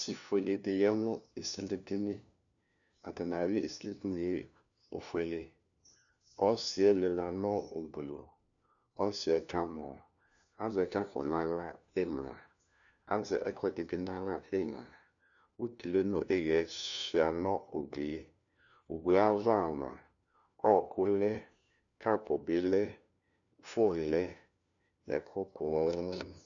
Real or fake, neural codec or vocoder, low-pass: fake; codec, 16 kHz, 1 kbps, FunCodec, trained on LibriTTS, 50 frames a second; 7.2 kHz